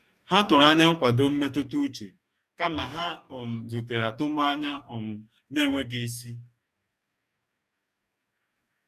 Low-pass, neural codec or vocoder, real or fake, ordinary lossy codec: 14.4 kHz; codec, 44.1 kHz, 2.6 kbps, DAC; fake; none